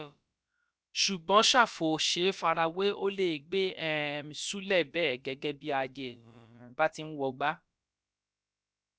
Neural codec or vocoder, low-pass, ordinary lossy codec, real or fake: codec, 16 kHz, about 1 kbps, DyCAST, with the encoder's durations; none; none; fake